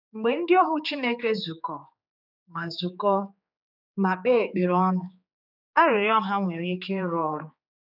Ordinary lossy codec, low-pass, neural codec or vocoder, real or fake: none; 5.4 kHz; codec, 16 kHz, 4 kbps, X-Codec, HuBERT features, trained on general audio; fake